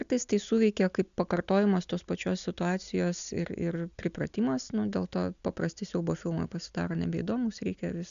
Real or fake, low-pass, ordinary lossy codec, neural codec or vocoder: real; 7.2 kHz; MP3, 96 kbps; none